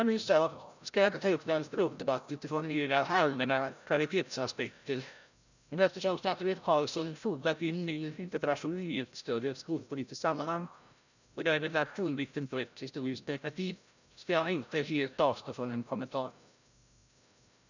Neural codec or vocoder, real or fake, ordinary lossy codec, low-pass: codec, 16 kHz, 0.5 kbps, FreqCodec, larger model; fake; none; 7.2 kHz